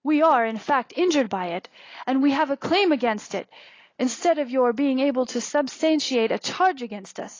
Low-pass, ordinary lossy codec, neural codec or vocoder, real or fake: 7.2 kHz; AAC, 32 kbps; none; real